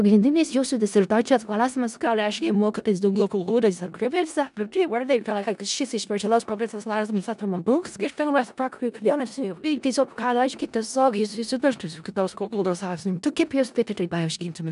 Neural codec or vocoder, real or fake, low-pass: codec, 16 kHz in and 24 kHz out, 0.4 kbps, LongCat-Audio-Codec, four codebook decoder; fake; 10.8 kHz